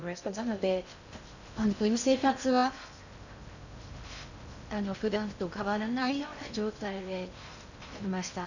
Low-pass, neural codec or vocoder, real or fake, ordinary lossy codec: 7.2 kHz; codec, 16 kHz in and 24 kHz out, 0.6 kbps, FocalCodec, streaming, 2048 codes; fake; none